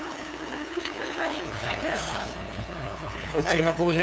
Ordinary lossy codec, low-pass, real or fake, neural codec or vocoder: none; none; fake; codec, 16 kHz, 2 kbps, FunCodec, trained on LibriTTS, 25 frames a second